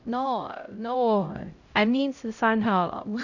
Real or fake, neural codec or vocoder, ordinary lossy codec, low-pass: fake; codec, 16 kHz, 0.5 kbps, X-Codec, HuBERT features, trained on LibriSpeech; none; 7.2 kHz